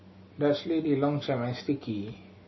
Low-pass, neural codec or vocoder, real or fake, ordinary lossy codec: 7.2 kHz; none; real; MP3, 24 kbps